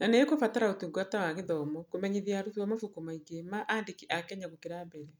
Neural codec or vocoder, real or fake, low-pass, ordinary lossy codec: none; real; none; none